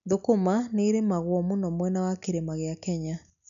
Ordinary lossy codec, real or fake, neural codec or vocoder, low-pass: none; real; none; 7.2 kHz